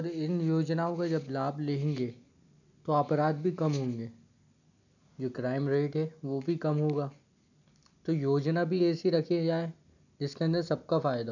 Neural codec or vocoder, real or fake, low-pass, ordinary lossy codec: none; real; 7.2 kHz; none